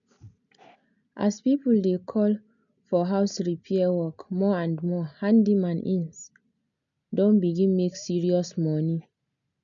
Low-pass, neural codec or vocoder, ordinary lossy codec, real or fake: 7.2 kHz; none; none; real